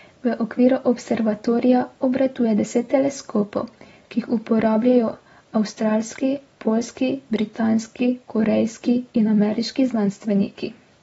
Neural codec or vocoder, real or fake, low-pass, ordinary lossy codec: none; real; 10.8 kHz; AAC, 24 kbps